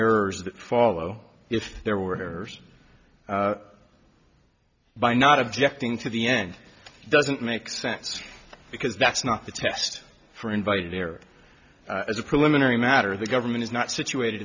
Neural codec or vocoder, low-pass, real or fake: none; 7.2 kHz; real